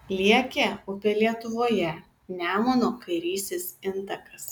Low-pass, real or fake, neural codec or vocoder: 19.8 kHz; real; none